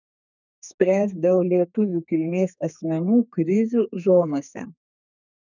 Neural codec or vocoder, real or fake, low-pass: codec, 32 kHz, 1.9 kbps, SNAC; fake; 7.2 kHz